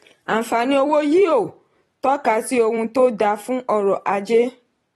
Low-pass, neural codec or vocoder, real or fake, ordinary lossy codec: 19.8 kHz; vocoder, 44.1 kHz, 128 mel bands every 256 samples, BigVGAN v2; fake; AAC, 32 kbps